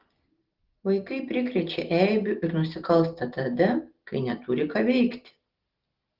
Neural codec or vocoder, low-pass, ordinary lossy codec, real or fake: none; 5.4 kHz; Opus, 16 kbps; real